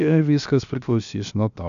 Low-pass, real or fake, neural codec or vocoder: 7.2 kHz; fake; codec, 16 kHz, 0.7 kbps, FocalCodec